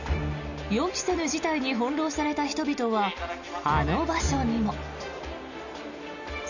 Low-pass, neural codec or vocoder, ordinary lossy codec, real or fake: 7.2 kHz; none; none; real